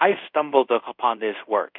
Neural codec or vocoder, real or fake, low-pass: codec, 24 kHz, 0.5 kbps, DualCodec; fake; 5.4 kHz